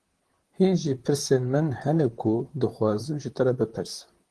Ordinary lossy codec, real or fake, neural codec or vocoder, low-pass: Opus, 16 kbps; fake; vocoder, 44.1 kHz, 128 mel bands, Pupu-Vocoder; 10.8 kHz